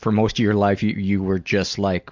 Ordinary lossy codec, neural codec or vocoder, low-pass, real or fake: MP3, 64 kbps; none; 7.2 kHz; real